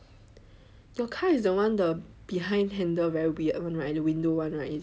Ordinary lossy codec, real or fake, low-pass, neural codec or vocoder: none; real; none; none